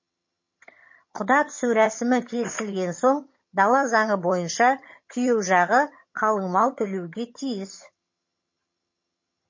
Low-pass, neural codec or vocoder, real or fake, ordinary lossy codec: 7.2 kHz; vocoder, 22.05 kHz, 80 mel bands, HiFi-GAN; fake; MP3, 32 kbps